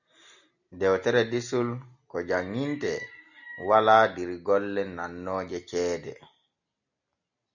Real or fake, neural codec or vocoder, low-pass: real; none; 7.2 kHz